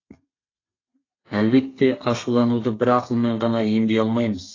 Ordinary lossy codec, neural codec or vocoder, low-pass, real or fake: AAC, 32 kbps; codec, 32 kHz, 1.9 kbps, SNAC; 7.2 kHz; fake